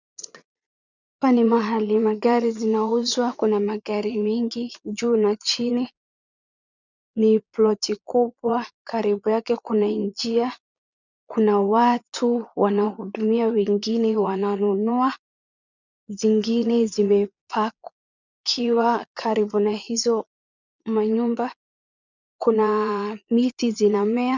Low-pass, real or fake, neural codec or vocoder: 7.2 kHz; fake; vocoder, 22.05 kHz, 80 mel bands, Vocos